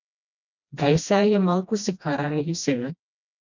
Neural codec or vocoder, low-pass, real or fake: codec, 16 kHz, 1 kbps, FreqCodec, smaller model; 7.2 kHz; fake